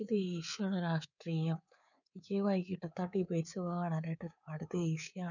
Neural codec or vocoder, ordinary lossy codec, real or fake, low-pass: codec, 24 kHz, 3.1 kbps, DualCodec; none; fake; 7.2 kHz